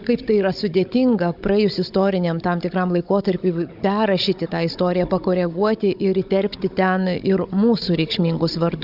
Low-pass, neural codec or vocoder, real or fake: 5.4 kHz; codec, 16 kHz, 16 kbps, FunCodec, trained on Chinese and English, 50 frames a second; fake